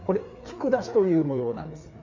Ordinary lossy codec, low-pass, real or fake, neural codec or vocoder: none; 7.2 kHz; fake; codec, 16 kHz, 4 kbps, FreqCodec, larger model